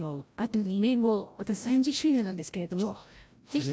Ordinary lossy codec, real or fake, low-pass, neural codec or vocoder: none; fake; none; codec, 16 kHz, 0.5 kbps, FreqCodec, larger model